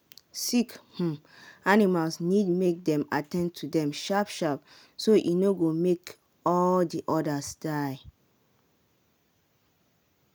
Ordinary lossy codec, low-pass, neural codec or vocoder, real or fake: none; none; none; real